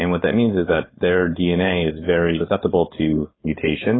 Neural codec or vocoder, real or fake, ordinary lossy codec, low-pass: codec, 16 kHz, 4.8 kbps, FACodec; fake; AAC, 16 kbps; 7.2 kHz